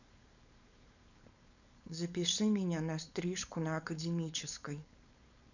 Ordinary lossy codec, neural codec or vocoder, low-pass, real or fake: none; codec, 16 kHz, 4.8 kbps, FACodec; 7.2 kHz; fake